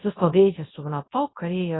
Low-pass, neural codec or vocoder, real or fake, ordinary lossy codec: 7.2 kHz; codec, 24 kHz, 0.9 kbps, WavTokenizer, large speech release; fake; AAC, 16 kbps